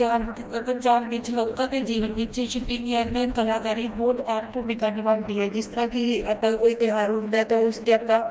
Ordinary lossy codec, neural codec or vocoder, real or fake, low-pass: none; codec, 16 kHz, 1 kbps, FreqCodec, smaller model; fake; none